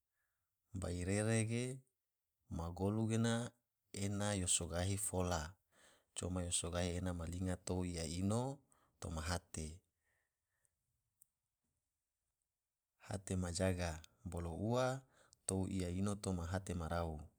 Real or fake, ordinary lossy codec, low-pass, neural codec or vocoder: fake; none; none; vocoder, 44.1 kHz, 128 mel bands every 512 samples, BigVGAN v2